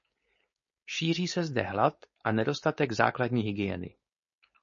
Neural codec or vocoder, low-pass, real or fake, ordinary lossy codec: codec, 16 kHz, 4.8 kbps, FACodec; 7.2 kHz; fake; MP3, 32 kbps